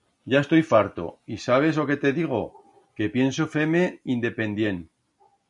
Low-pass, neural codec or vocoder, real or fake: 10.8 kHz; none; real